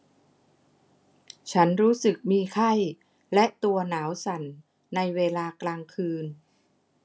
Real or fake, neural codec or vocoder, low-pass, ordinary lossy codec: real; none; none; none